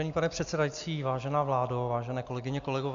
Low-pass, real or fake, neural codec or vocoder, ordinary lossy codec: 7.2 kHz; real; none; AAC, 64 kbps